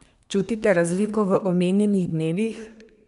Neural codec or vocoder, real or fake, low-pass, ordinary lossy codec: codec, 24 kHz, 1 kbps, SNAC; fake; 10.8 kHz; none